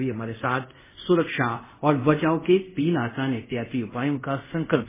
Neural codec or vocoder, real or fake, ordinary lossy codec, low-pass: codec, 24 kHz, 0.5 kbps, DualCodec; fake; MP3, 16 kbps; 3.6 kHz